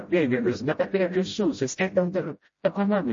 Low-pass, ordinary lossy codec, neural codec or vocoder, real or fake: 7.2 kHz; MP3, 32 kbps; codec, 16 kHz, 0.5 kbps, FreqCodec, smaller model; fake